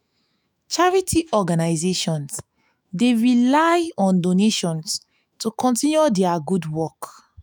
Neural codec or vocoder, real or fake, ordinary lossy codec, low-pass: autoencoder, 48 kHz, 128 numbers a frame, DAC-VAE, trained on Japanese speech; fake; none; none